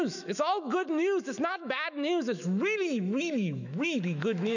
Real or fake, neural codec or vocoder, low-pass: fake; autoencoder, 48 kHz, 128 numbers a frame, DAC-VAE, trained on Japanese speech; 7.2 kHz